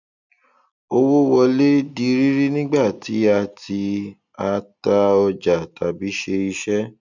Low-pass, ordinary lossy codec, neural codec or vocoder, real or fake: 7.2 kHz; none; none; real